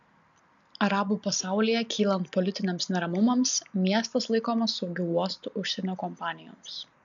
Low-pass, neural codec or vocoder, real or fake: 7.2 kHz; none; real